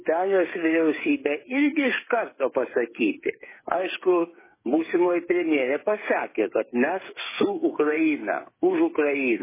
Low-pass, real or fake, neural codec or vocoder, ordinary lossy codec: 3.6 kHz; fake; codec, 16 kHz, 8 kbps, FreqCodec, smaller model; MP3, 16 kbps